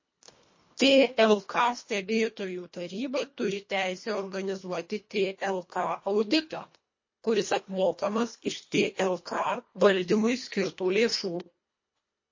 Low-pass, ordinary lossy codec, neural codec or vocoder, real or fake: 7.2 kHz; MP3, 32 kbps; codec, 24 kHz, 1.5 kbps, HILCodec; fake